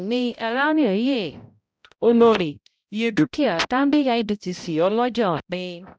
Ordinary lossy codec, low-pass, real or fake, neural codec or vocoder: none; none; fake; codec, 16 kHz, 0.5 kbps, X-Codec, HuBERT features, trained on balanced general audio